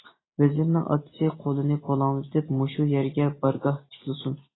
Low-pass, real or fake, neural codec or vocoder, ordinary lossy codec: 7.2 kHz; real; none; AAC, 16 kbps